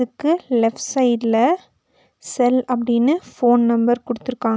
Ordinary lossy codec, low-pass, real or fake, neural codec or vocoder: none; none; real; none